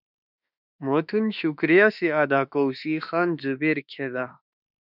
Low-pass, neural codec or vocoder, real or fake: 5.4 kHz; autoencoder, 48 kHz, 32 numbers a frame, DAC-VAE, trained on Japanese speech; fake